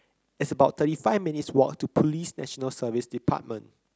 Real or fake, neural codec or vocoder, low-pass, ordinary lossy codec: real; none; none; none